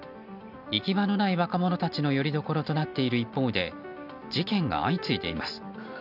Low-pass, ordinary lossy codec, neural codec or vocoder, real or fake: 5.4 kHz; none; none; real